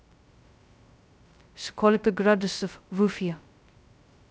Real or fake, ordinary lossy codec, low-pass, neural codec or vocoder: fake; none; none; codec, 16 kHz, 0.2 kbps, FocalCodec